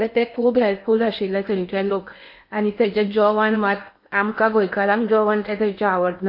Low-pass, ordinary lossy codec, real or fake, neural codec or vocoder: 5.4 kHz; MP3, 32 kbps; fake; codec, 16 kHz in and 24 kHz out, 0.8 kbps, FocalCodec, streaming, 65536 codes